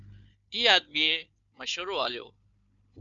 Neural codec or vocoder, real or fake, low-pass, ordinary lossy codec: codec, 16 kHz, 4 kbps, FunCodec, trained on Chinese and English, 50 frames a second; fake; 7.2 kHz; Opus, 64 kbps